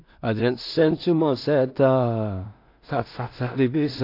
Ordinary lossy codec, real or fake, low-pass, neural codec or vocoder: AAC, 32 kbps; fake; 5.4 kHz; codec, 16 kHz in and 24 kHz out, 0.4 kbps, LongCat-Audio-Codec, two codebook decoder